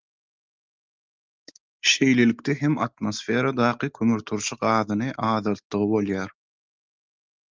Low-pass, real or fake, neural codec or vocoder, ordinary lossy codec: 7.2 kHz; real; none; Opus, 24 kbps